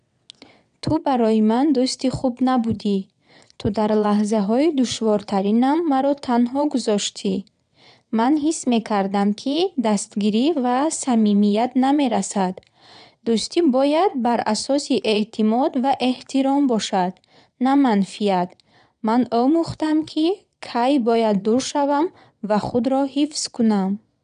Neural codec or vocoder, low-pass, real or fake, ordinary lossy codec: vocoder, 22.05 kHz, 80 mel bands, WaveNeXt; 9.9 kHz; fake; none